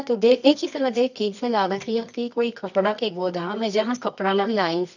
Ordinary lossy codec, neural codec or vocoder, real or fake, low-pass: none; codec, 24 kHz, 0.9 kbps, WavTokenizer, medium music audio release; fake; 7.2 kHz